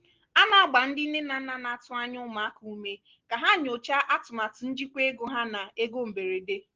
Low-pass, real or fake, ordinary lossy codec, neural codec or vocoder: 7.2 kHz; real; Opus, 16 kbps; none